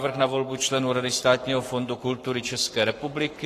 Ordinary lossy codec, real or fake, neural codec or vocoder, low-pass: AAC, 48 kbps; fake; codec, 44.1 kHz, 7.8 kbps, Pupu-Codec; 14.4 kHz